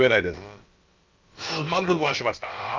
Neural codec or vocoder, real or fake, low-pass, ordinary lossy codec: codec, 16 kHz, about 1 kbps, DyCAST, with the encoder's durations; fake; 7.2 kHz; Opus, 24 kbps